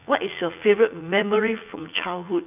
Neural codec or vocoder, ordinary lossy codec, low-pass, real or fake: vocoder, 44.1 kHz, 80 mel bands, Vocos; none; 3.6 kHz; fake